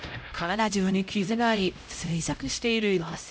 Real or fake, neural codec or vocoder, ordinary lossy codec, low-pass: fake; codec, 16 kHz, 0.5 kbps, X-Codec, HuBERT features, trained on LibriSpeech; none; none